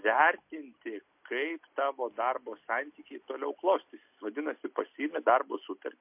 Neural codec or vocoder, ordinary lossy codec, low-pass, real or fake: none; MP3, 32 kbps; 3.6 kHz; real